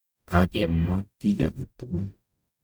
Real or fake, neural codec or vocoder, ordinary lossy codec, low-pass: fake; codec, 44.1 kHz, 0.9 kbps, DAC; none; none